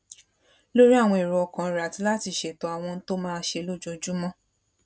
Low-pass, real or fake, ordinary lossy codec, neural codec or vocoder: none; real; none; none